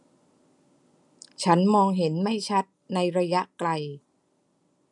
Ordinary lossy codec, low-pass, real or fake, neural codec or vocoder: none; 10.8 kHz; real; none